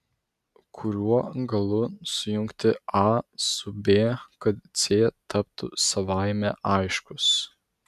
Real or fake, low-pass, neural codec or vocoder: real; 14.4 kHz; none